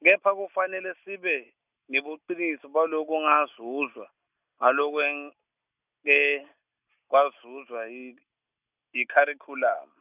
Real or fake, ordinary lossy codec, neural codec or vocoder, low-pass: real; none; none; 3.6 kHz